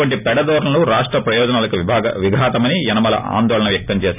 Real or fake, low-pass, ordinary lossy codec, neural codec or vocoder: real; 3.6 kHz; none; none